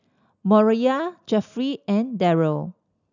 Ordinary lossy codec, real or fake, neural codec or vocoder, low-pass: none; real; none; 7.2 kHz